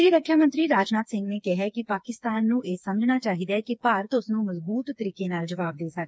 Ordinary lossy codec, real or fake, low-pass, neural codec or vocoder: none; fake; none; codec, 16 kHz, 4 kbps, FreqCodec, smaller model